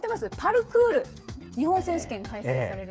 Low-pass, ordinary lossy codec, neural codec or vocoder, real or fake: none; none; codec, 16 kHz, 8 kbps, FreqCodec, smaller model; fake